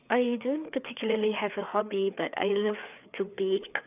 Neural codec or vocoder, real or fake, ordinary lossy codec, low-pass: codec, 16 kHz, 4 kbps, FreqCodec, larger model; fake; none; 3.6 kHz